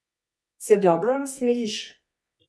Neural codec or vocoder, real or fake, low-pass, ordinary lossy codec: codec, 24 kHz, 0.9 kbps, WavTokenizer, medium music audio release; fake; none; none